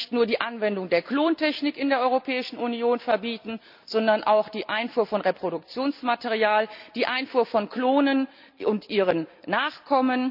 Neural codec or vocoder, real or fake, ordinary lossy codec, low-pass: none; real; none; 5.4 kHz